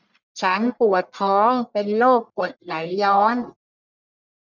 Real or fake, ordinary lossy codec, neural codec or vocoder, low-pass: fake; none; codec, 44.1 kHz, 1.7 kbps, Pupu-Codec; 7.2 kHz